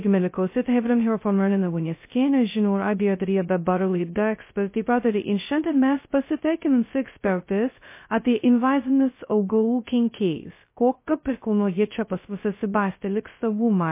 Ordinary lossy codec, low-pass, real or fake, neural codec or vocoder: MP3, 24 kbps; 3.6 kHz; fake; codec, 16 kHz, 0.2 kbps, FocalCodec